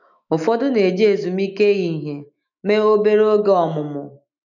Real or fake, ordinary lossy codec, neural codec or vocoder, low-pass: fake; none; autoencoder, 48 kHz, 128 numbers a frame, DAC-VAE, trained on Japanese speech; 7.2 kHz